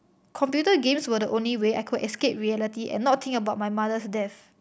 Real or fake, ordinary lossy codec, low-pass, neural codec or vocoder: real; none; none; none